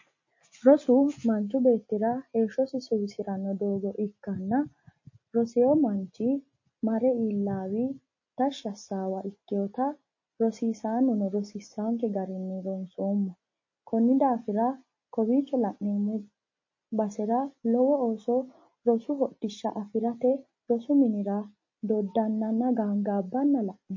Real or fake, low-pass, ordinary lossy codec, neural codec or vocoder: real; 7.2 kHz; MP3, 32 kbps; none